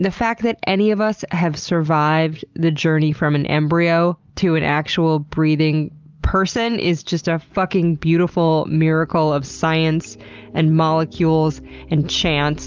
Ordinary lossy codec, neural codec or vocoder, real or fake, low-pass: Opus, 24 kbps; none; real; 7.2 kHz